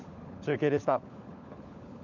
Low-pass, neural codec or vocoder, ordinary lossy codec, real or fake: 7.2 kHz; codec, 16 kHz, 16 kbps, FunCodec, trained on LibriTTS, 50 frames a second; none; fake